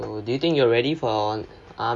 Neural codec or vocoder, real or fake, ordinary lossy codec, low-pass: none; real; none; none